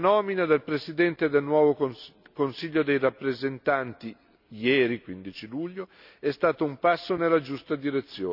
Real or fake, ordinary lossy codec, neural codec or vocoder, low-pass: real; none; none; 5.4 kHz